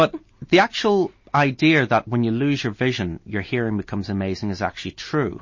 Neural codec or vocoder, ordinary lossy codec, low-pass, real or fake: none; MP3, 32 kbps; 7.2 kHz; real